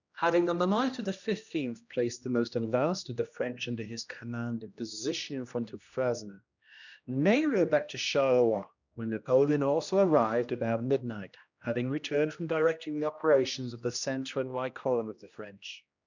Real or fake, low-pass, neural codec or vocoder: fake; 7.2 kHz; codec, 16 kHz, 1 kbps, X-Codec, HuBERT features, trained on general audio